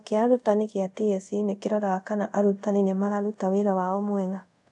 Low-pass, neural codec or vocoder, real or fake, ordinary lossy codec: 10.8 kHz; codec, 24 kHz, 0.5 kbps, DualCodec; fake; none